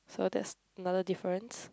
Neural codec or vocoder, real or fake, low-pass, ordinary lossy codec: none; real; none; none